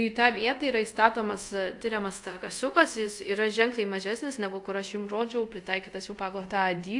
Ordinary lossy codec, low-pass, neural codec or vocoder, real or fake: AAC, 64 kbps; 10.8 kHz; codec, 24 kHz, 0.5 kbps, DualCodec; fake